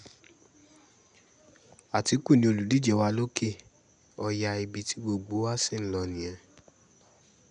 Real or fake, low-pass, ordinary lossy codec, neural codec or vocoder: real; 9.9 kHz; none; none